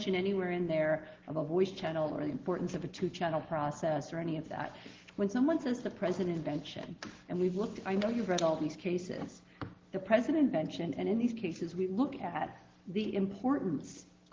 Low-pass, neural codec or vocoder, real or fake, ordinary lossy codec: 7.2 kHz; none; real; Opus, 16 kbps